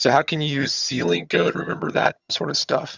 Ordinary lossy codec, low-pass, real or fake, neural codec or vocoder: Opus, 64 kbps; 7.2 kHz; fake; vocoder, 22.05 kHz, 80 mel bands, HiFi-GAN